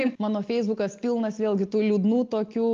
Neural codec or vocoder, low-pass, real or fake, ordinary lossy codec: none; 7.2 kHz; real; Opus, 32 kbps